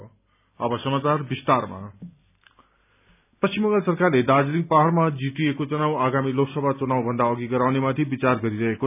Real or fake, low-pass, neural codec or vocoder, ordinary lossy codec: real; 3.6 kHz; none; none